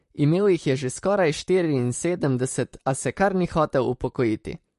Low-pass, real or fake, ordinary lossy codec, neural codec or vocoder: 14.4 kHz; fake; MP3, 48 kbps; vocoder, 44.1 kHz, 128 mel bands, Pupu-Vocoder